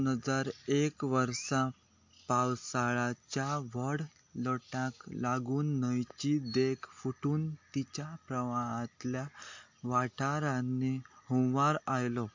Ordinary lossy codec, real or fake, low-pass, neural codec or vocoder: MP3, 48 kbps; real; 7.2 kHz; none